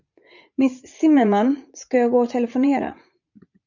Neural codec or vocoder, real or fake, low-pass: vocoder, 44.1 kHz, 80 mel bands, Vocos; fake; 7.2 kHz